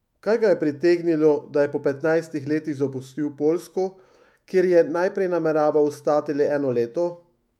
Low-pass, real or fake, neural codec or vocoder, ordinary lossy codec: 19.8 kHz; fake; autoencoder, 48 kHz, 128 numbers a frame, DAC-VAE, trained on Japanese speech; MP3, 96 kbps